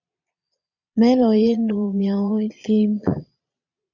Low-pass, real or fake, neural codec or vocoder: 7.2 kHz; fake; vocoder, 22.05 kHz, 80 mel bands, Vocos